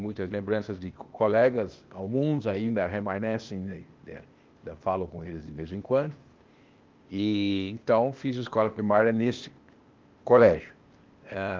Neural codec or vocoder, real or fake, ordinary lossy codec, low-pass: codec, 16 kHz, 0.8 kbps, ZipCodec; fake; Opus, 32 kbps; 7.2 kHz